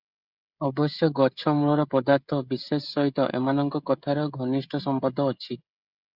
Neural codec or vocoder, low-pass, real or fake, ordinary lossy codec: codec, 16 kHz, 16 kbps, FreqCodec, smaller model; 5.4 kHz; fake; Opus, 64 kbps